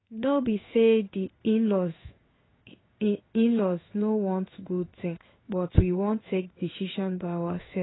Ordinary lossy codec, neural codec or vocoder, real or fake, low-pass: AAC, 16 kbps; codec, 16 kHz in and 24 kHz out, 1 kbps, XY-Tokenizer; fake; 7.2 kHz